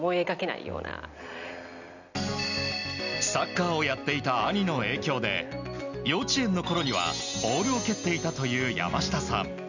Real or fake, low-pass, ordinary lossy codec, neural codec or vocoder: real; 7.2 kHz; none; none